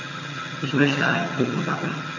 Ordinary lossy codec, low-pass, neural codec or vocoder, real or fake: none; 7.2 kHz; vocoder, 22.05 kHz, 80 mel bands, HiFi-GAN; fake